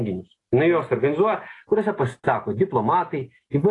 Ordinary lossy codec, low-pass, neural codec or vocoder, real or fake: AAC, 32 kbps; 10.8 kHz; none; real